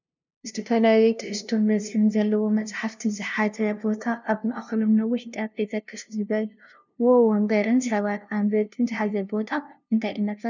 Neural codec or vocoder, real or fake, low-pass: codec, 16 kHz, 0.5 kbps, FunCodec, trained on LibriTTS, 25 frames a second; fake; 7.2 kHz